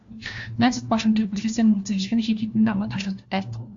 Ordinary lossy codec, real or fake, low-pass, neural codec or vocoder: AAC, 64 kbps; fake; 7.2 kHz; codec, 16 kHz, 1 kbps, FunCodec, trained on LibriTTS, 50 frames a second